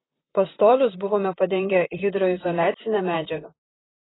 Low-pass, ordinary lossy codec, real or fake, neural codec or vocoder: 7.2 kHz; AAC, 16 kbps; fake; vocoder, 44.1 kHz, 128 mel bands, Pupu-Vocoder